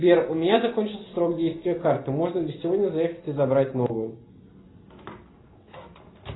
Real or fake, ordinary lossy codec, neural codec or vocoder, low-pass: real; AAC, 16 kbps; none; 7.2 kHz